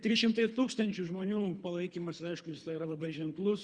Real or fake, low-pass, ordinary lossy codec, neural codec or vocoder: fake; 9.9 kHz; Opus, 64 kbps; codec, 24 kHz, 3 kbps, HILCodec